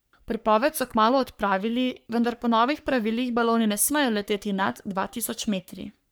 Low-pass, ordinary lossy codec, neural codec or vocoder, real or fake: none; none; codec, 44.1 kHz, 3.4 kbps, Pupu-Codec; fake